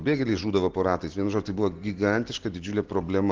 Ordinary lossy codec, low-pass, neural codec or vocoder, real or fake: Opus, 16 kbps; 7.2 kHz; none; real